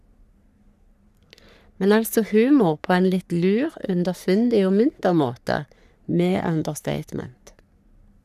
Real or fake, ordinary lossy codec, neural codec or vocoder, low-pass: fake; none; codec, 44.1 kHz, 3.4 kbps, Pupu-Codec; 14.4 kHz